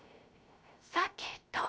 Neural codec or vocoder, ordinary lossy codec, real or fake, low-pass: codec, 16 kHz, 0.3 kbps, FocalCodec; none; fake; none